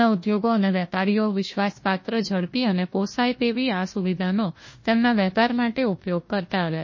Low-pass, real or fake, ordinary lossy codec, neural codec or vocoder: 7.2 kHz; fake; MP3, 32 kbps; codec, 16 kHz, 1 kbps, FunCodec, trained on Chinese and English, 50 frames a second